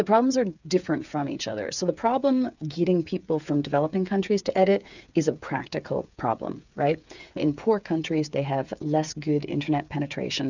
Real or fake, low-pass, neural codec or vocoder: fake; 7.2 kHz; codec, 16 kHz, 8 kbps, FreqCodec, smaller model